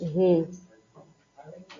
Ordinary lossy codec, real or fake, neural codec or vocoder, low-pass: AAC, 48 kbps; real; none; 7.2 kHz